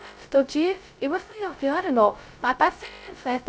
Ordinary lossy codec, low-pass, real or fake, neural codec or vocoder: none; none; fake; codec, 16 kHz, 0.2 kbps, FocalCodec